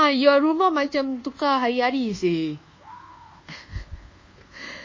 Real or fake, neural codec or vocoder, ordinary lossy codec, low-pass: fake; codec, 24 kHz, 1.2 kbps, DualCodec; MP3, 32 kbps; 7.2 kHz